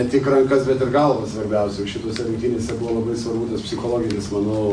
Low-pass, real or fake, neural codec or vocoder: 9.9 kHz; real; none